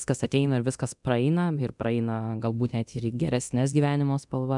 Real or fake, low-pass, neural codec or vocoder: fake; 10.8 kHz; codec, 24 kHz, 0.9 kbps, DualCodec